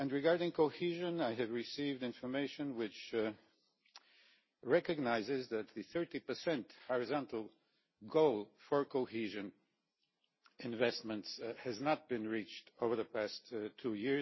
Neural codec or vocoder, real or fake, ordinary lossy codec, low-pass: none; real; MP3, 24 kbps; 7.2 kHz